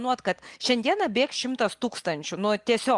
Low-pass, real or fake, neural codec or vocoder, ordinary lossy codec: 10.8 kHz; real; none; Opus, 32 kbps